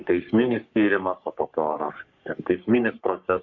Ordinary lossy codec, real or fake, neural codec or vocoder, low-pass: AAC, 48 kbps; fake; codec, 44.1 kHz, 3.4 kbps, Pupu-Codec; 7.2 kHz